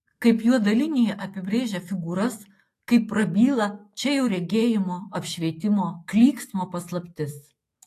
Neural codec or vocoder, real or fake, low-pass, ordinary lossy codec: autoencoder, 48 kHz, 128 numbers a frame, DAC-VAE, trained on Japanese speech; fake; 14.4 kHz; AAC, 48 kbps